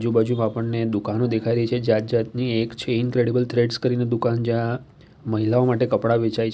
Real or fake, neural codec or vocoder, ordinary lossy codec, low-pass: real; none; none; none